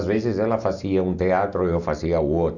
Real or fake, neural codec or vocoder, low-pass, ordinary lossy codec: real; none; 7.2 kHz; none